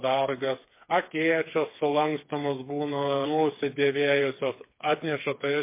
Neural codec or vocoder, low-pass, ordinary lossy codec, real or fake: codec, 16 kHz, 4 kbps, FreqCodec, smaller model; 3.6 kHz; MP3, 24 kbps; fake